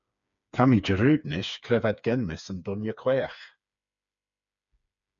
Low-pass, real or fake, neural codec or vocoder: 7.2 kHz; fake; codec, 16 kHz, 4 kbps, FreqCodec, smaller model